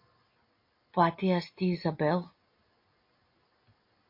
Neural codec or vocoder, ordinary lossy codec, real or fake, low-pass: none; MP3, 32 kbps; real; 5.4 kHz